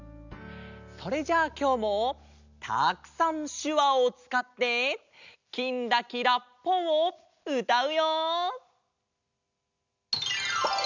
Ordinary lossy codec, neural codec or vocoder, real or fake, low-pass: none; none; real; 7.2 kHz